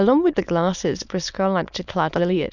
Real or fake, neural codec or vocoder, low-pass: fake; autoencoder, 22.05 kHz, a latent of 192 numbers a frame, VITS, trained on many speakers; 7.2 kHz